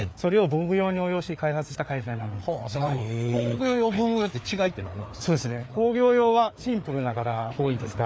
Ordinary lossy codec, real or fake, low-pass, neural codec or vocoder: none; fake; none; codec, 16 kHz, 4 kbps, FreqCodec, larger model